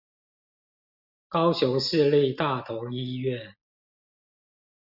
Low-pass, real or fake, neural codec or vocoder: 5.4 kHz; real; none